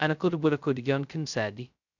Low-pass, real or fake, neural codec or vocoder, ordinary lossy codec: 7.2 kHz; fake; codec, 16 kHz, 0.2 kbps, FocalCodec; none